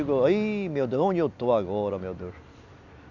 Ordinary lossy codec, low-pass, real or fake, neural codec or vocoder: none; 7.2 kHz; real; none